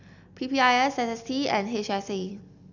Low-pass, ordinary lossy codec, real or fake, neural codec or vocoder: 7.2 kHz; none; real; none